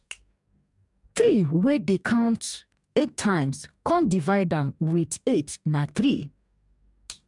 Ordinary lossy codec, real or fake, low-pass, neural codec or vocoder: MP3, 96 kbps; fake; 10.8 kHz; codec, 44.1 kHz, 2.6 kbps, DAC